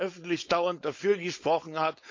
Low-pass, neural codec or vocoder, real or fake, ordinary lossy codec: 7.2 kHz; codec, 16 kHz, 4.8 kbps, FACodec; fake; MP3, 48 kbps